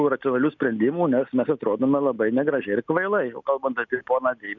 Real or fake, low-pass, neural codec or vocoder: real; 7.2 kHz; none